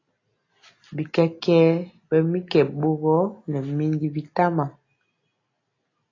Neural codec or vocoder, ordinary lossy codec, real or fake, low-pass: none; AAC, 32 kbps; real; 7.2 kHz